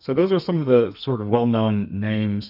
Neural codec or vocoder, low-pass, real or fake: codec, 32 kHz, 1.9 kbps, SNAC; 5.4 kHz; fake